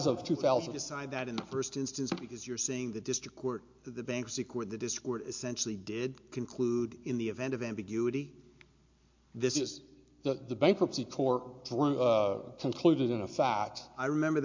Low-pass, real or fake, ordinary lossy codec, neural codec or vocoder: 7.2 kHz; real; MP3, 48 kbps; none